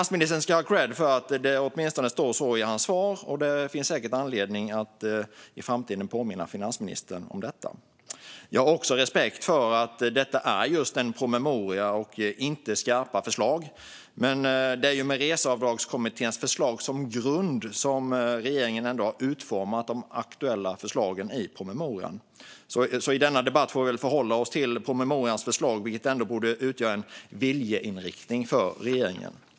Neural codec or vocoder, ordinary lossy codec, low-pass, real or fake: none; none; none; real